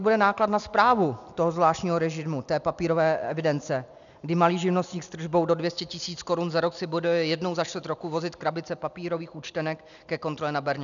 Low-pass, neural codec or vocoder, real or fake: 7.2 kHz; none; real